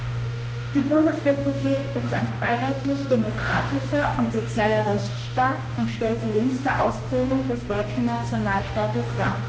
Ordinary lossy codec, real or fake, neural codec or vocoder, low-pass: none; fake; codec, 16 kHz, 1 kbps, X-Codec, HuBERT features, trained on balanced general audio; none